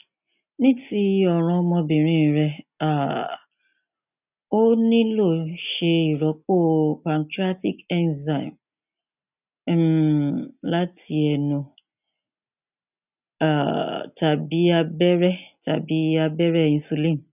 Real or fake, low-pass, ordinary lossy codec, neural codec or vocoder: real; 3.6 kHz; none; none